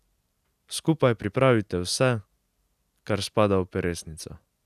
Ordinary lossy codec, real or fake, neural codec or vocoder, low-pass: none; real; none; 14.4 kHz